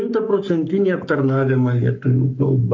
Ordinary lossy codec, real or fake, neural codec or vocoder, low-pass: AAC, 48 kbps; fake; codec, 44.1 kHz, 7.8 kbps, Pupu-Codec; 7.2 kHz